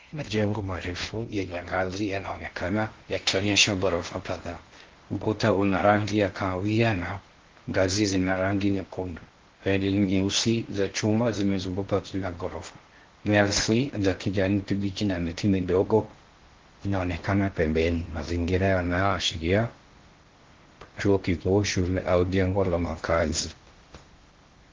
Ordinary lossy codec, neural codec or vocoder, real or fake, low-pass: Opus, 16 kbps; codec, 16 kHz in and 24 kHz out, 0.6 kbps, FocalCodec, streaming, 2048 codes; fake; 7.2 kHz